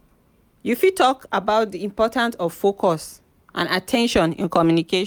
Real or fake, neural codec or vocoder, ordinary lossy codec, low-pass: real; none; none; none